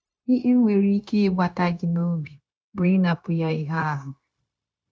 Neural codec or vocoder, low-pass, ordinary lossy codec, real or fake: codec, 16 kHz, 0.9 kbps, LongCat-Audio-Codec; none; none; fake